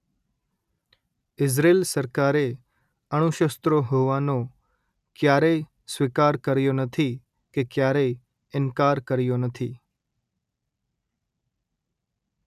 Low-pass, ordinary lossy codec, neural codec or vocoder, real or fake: 14.4 kHz; none; none; real